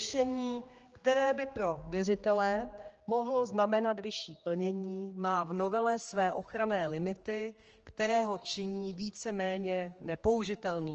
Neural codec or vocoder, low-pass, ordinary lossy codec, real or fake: codec, 16 kHz, 2 kbps, X-Codec, HuBERT features, trained on general audio; 7.2 kHz; Opus, 24 kbps; fake